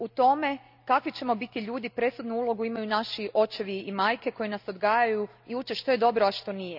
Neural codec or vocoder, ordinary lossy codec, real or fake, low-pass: none; none; real; 5.4 kHz